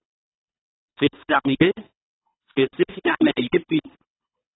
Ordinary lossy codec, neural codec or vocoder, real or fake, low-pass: AAC, 16 kbps; codec, 24 kHz, 3 kbps, HILCodec; fake; 7.2 kHz